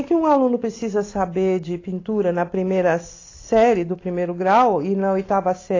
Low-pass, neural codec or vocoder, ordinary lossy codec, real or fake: 7.2 kHz; none; AAC, 32 kbps; real